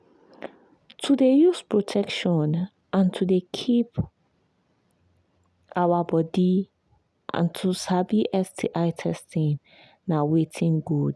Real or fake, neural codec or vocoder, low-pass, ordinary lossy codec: real; none; none; none